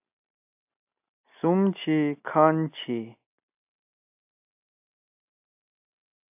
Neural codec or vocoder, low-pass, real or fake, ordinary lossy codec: none; 3.6 kHz; real; AAC, 32 kbps